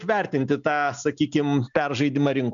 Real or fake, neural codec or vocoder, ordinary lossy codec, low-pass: real; none; MP3, 96 kbps; 7.2 kHz